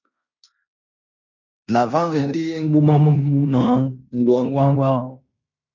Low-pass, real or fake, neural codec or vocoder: 7.2 kHz; fake; codec, 16 kHz in and 24 kHz out, 0.9 kbps, LongCat-Audio-Codec, fine tuned four codebook decoder